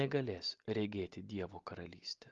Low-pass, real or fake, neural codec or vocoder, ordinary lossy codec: 7.2 kHz; real; none; Opus, 32 kbps